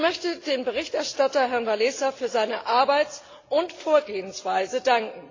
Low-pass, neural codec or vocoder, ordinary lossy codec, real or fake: 7.2 kHz; none; AAC, 32 kbps; real